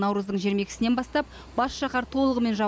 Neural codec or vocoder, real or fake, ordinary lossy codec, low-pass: none; real; none; none